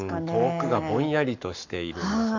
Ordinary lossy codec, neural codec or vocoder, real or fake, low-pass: none; codec, 44.1 kHz, 7.8 kbps, Pupu-Codec; fake; 7.2 kHz